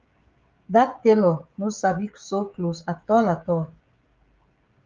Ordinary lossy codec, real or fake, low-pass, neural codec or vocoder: Opus, 24 kbps; fake; 7.2 kHz; codec, 16 kHz, 16 kbps, FreqCodec, smaller model